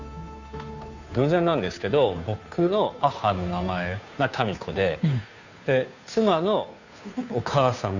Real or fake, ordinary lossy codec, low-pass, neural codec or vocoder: fake; none; 7.2 kHz; codec, 16 kHz, 2 kbps, FunCodec, trained on Chinese and English, 25 frames a second